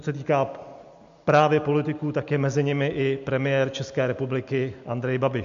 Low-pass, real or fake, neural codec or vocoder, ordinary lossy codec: 7.2 kHz; real; none; MP3, 64 kbps